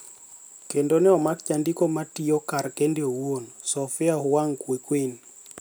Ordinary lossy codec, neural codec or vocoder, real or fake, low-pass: none; none; real; none